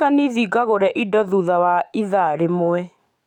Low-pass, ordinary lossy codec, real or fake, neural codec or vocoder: 19.8 kHz; MP3, 96 kbps; fake; codec, 44.1 kHz, 7.8 kbps, DAC